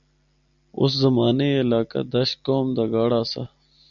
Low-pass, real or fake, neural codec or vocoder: 7.2 kHz; real; none